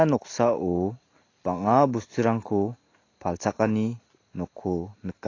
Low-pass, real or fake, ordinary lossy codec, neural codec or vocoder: 7.2 kHz; real; AAC, 32 kbps; none